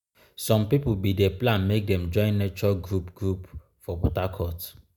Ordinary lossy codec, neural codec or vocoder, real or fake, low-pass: none; none; real; 19.8 kHz